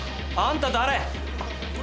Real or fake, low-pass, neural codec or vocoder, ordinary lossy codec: real; none; none; none